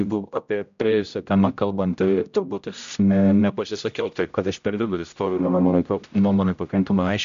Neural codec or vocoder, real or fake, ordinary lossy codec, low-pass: codec, 16 kHz, 0.5 kbps, X-Codec, HuBERT features, trained on general audio; fake; MP3, 64 kbps; 7.2 kHz